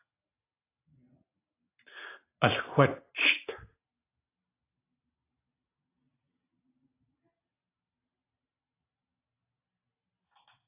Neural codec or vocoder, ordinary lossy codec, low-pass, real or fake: none; AAC, 24 kbps; 3.6 kHz; real